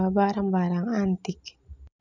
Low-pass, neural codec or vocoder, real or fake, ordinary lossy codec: 7.2 kHz; none; real; none